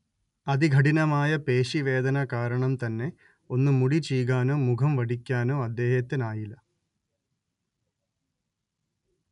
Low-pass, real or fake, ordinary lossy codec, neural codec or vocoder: 10.8 kHz; real; none; none